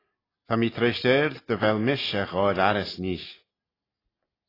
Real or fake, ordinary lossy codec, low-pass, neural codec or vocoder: real; AAC, 24 kbps; 5.4 kHz; none